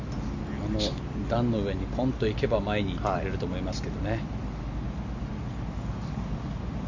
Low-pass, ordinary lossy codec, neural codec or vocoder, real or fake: 7.2 kHz; none; none; real